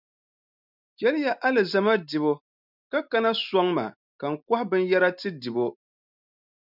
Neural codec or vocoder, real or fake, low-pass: none; real; 5.4 kHz